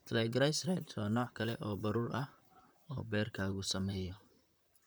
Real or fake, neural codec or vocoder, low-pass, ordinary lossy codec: fake; vocoder, 44.1 kHz, 128 mel bands, Pupu-Vocoder; none; none